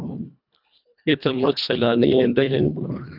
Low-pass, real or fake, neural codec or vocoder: 5.4 kHz; fake; codec, 24 kHz, 1.5 kbps, HILCodec